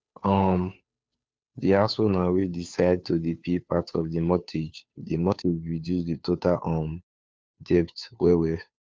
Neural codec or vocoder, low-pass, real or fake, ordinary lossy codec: codec, 16 kHz, 2 kbps, FunCodec, trained on Chinese and English, 25 frames a second; none; fake; none